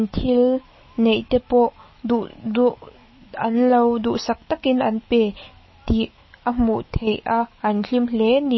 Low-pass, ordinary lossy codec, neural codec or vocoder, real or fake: 7.2 kHz; MP3, 24 kbps; autoencoder, 48 kHz, 128 numbers a frame, DAC-VAE, trained on Japanese speech; fake